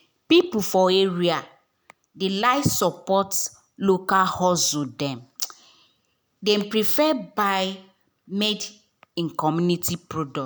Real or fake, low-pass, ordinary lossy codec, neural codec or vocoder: real; none; none; none